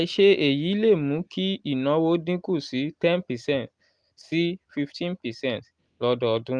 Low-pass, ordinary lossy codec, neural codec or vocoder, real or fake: 9.9 kHz; Opus, 32 kbps; none; real